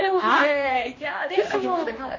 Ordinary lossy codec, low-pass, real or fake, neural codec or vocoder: MP3, 32 kbps; 7.2 kHz; fake; codec, 16 kHz, 1 kbps, X-Codec, HuBERT features, trained on general audio